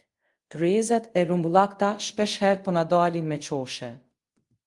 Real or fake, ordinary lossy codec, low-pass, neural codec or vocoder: fake; Opus, 24 kbps; 10.8 kHz; codec, 24 kHz, 0.5 kbps, DualCodec